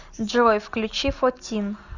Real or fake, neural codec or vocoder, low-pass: real; none; 7.2 kHz